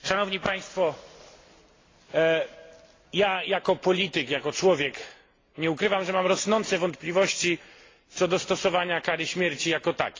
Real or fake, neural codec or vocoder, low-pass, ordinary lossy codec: real; none; 7.2 kHz; AAC, 32 kbps